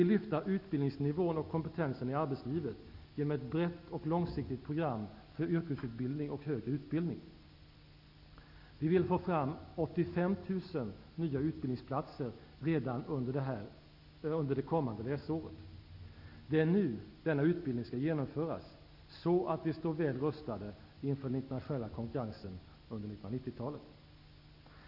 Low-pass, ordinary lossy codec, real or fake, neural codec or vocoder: 5.4 kHz; none; real; none